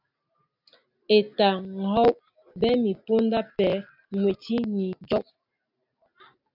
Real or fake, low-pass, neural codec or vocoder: real; 5.4 kHz; none